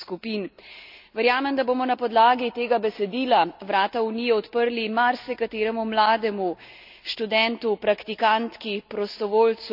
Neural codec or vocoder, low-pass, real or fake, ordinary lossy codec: none; 5.4 kHz; real; none